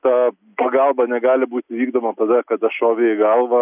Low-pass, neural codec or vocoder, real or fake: 3.6 kHz; none; real